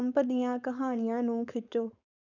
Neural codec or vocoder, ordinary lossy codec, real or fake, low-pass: codec, 16 kHz, 4.8 kbps, FACodec; none; fake; 7.2 kHz